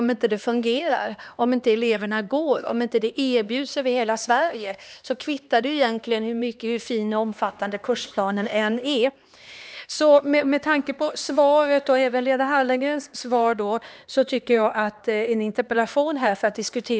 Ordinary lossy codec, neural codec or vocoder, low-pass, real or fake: none; codec, 16 kHz, 2 kbps, X-Codec, HuBERT features, trained on LibriSpeech; none; fake